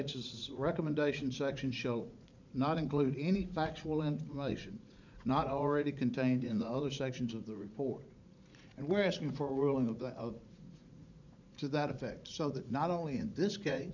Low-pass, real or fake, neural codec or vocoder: 7.2 kHz; fake; vocoder, 44.1 kHz, 80 mel bands, Vocos